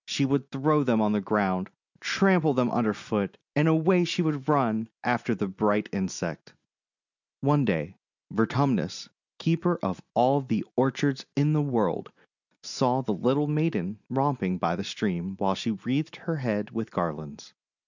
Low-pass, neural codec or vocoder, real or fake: 7.2 kHz; none; real